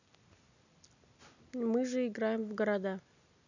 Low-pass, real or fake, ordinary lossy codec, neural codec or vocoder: 7.2 kHz; real; none; none